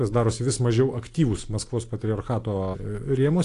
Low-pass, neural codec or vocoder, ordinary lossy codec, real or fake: 10.8 kHz; none; AAC, 48 kbps; real